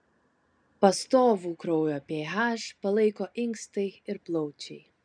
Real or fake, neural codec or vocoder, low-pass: real; none; 9.9 kHz